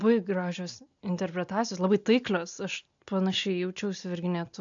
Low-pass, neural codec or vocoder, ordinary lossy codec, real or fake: 7.2 kHz; none; AAC, 64 kbps; real